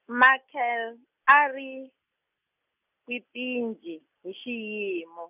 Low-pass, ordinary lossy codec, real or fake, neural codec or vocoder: 3.6 kHz; none; real; none